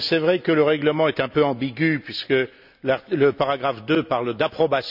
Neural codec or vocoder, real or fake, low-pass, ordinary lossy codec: none; real; 5.4 kHz; none